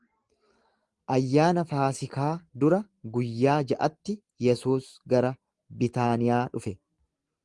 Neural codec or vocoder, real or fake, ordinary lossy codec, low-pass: none; real; Opus, 24 kbps; 10.8 kHz